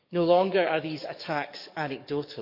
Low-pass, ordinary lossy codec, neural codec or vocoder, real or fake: 5.4 kHz; none; codec, 44.1 kHz, 7.8 kbps, DAC; fake